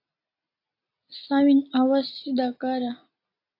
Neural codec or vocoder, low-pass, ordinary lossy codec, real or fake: none; 5.4 kHz; AAC, 32 kbps; real